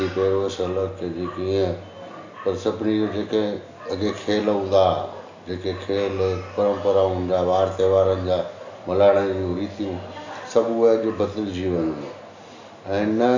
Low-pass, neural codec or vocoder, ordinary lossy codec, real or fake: 7.2 kHz; none; AAC, 48 kbps; real